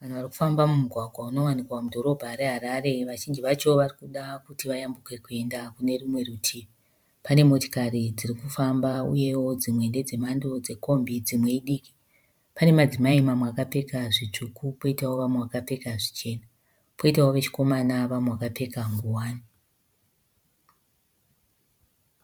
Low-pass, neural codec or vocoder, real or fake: 19.8 kHz; vocoder, 44.1 kHz, 128 mel bands every 512 samples, BigVGAN v2; fake